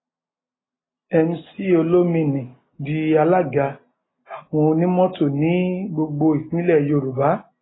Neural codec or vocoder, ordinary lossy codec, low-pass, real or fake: none; AAC, 16 kbps; 7.2 kHz; real